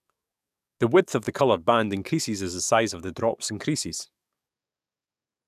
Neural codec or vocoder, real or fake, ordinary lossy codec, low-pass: codec, 44.1 kHz, 7.8 kbps, DAC; fake; none; 14.4 kHz